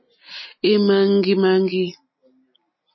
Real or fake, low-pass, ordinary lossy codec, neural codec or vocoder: real; 7.2 kHz; MP3, 24 kbps; none